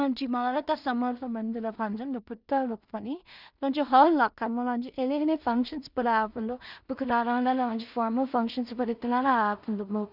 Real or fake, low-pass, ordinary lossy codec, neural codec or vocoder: fake; 5.4 kHz; none; codec, 16 kHz in and 24 kHz out, 0.4 kbps, LongCat-Audio-Codec, two codebook decoder